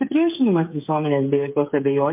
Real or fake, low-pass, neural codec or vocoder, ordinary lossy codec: fake; 3.6 kHz; codec, 16 kHz, 8 kbps, FreqCodec, smaller model; MP3, 32 kbps